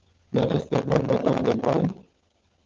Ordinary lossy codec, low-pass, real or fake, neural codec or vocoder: Opus, 24 kbps; 7.2 kHz; fake; codec, 16 kHz, 16 kbps, FunCodec, trained on LibriTTS, 50 frames a second